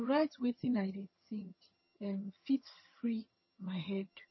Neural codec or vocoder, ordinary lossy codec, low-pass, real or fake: vocoder, 22.05 kHz, 80 mel bands, HiFi-GAN; MP3, 24 kbps; 7.2 kHz; fake